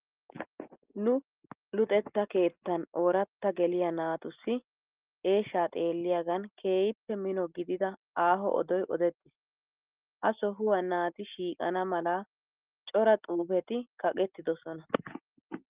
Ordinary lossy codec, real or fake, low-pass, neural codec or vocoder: Opus, 32 kbps; real; 3.6 kHz; none